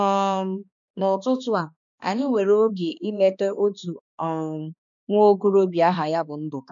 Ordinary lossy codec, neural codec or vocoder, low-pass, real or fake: MP3, 96 kbps; codec, 16 kHz, 2 kbps, X-Codec, HuBERT features, trained on balanced general audio; 7.2 kHz; fake